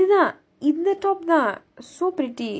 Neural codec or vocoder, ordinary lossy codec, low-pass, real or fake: none; none; none; real